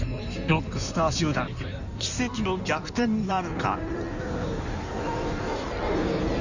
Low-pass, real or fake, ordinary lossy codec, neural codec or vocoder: 7.2 kHz; fake; none; codec, 16 kHz in and 24 kHz out, 1.1 kbps, FireRedTTS-2 codec